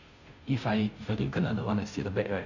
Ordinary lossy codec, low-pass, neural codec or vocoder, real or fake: none; 7.2 kHz; codec, 16 kHz, 0.5 kbps, FunCodec, trained on Chinese and English, 25 frames a second; fake